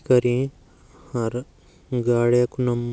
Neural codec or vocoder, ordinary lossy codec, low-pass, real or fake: none; none; none; real